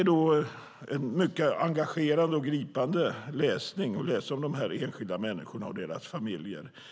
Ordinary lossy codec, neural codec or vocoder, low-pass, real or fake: none; none; none; real